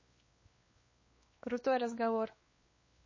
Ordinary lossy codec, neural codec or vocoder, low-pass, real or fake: MP3, 32 kbps; codec, 16 kHz, 2 kbps, X-Codec, WavLM features, trained on Multilingual LibriSpeech; 7.2 kHz; fake